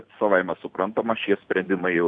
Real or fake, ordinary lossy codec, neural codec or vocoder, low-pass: real; MP3, 96 kbps; none; 9.9 kHz